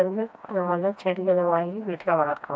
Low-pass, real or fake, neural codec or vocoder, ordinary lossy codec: none; fake; codec, 16 kHz, 1 kbps, FreqCodec, smaller model; none